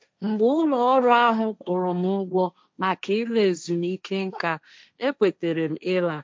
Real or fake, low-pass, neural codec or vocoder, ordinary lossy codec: fake; none; codec, 16 kHz, 1.1 kbps, Voila-Tokenizer; none